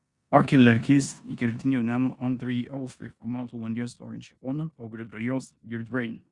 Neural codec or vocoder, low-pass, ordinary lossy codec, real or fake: codec, 16 kHz in and 24 kHz out, 0.9 kbps, LongCat-Audio-Codec, four codebook decoder; 10.8 kHz; Opus, 64 kbps; fake